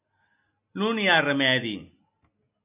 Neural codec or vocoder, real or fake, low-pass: none; real; 3.6 kHz